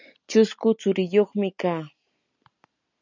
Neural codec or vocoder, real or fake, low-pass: none; real; 7.2 kHz